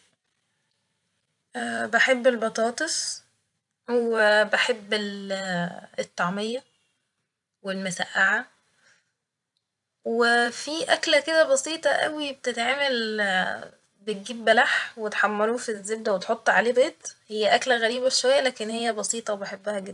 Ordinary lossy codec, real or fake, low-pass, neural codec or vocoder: none; fake; 10.8 kHz; vocoder, 44.1 kHz, 128 mel bands every 512 samples, BigVGAN v2